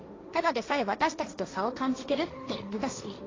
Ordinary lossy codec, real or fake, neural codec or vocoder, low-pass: AAC, 32 kbps; fake; codec, 16 kHz, 1.1 kbps, Voila-Tokenizer; 7.2 kHz